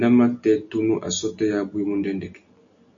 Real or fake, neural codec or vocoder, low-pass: real; none; 7.2 kHz